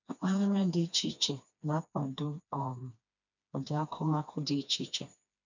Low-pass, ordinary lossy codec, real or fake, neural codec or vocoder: 7.2 kHz; none; fake; codec, 16 kHz, 2 kbps, FreqCodec, smaller model